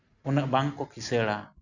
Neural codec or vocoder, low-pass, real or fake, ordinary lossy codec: none; 7.2 kHz; real; none